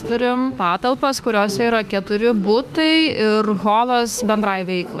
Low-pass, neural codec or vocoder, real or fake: 14.4 kHz; autoencoder, 48 kHz, 32 numbers a frame, DAC-VAE, trained on Japanese speech; fake